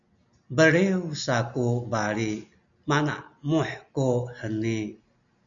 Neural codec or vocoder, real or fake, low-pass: none; real; 7.2 kHz